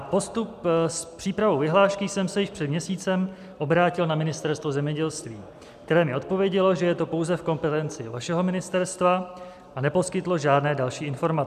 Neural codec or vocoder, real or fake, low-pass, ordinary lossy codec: none; real; 14.4 kHz; MP3, 96 kbps